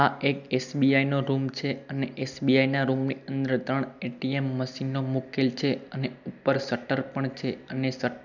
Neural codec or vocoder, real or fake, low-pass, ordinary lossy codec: none; real; 7.2 kHz; none